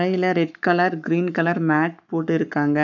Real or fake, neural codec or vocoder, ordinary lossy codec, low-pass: fake; codec, 44.1 kHz, 7.8 kbps, Pupu-Codec; none; 7.2 kHz